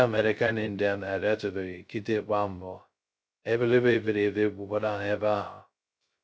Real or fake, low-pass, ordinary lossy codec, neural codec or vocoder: fake; none; none; codec, 16 kHz, 0.2 kbps, FocalCodec